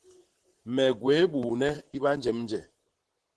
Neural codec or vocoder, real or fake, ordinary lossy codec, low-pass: vocoder, 44.1 kHz, 128 mel bands, Pupu-Vocoder; fake; Opus, 16 kbps; 10.8 kHz